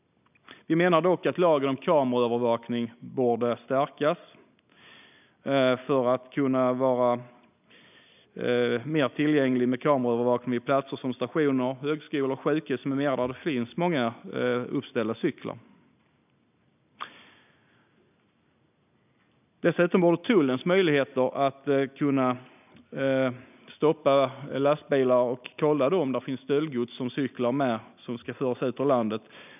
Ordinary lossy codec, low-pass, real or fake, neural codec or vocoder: none; 3.6 kHz; real; none